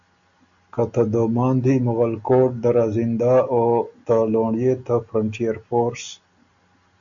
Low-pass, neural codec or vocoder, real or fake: 7.2 kHz; none; real